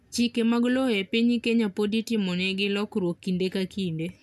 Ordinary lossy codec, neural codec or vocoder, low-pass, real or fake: none; none; 14.4 kHz; real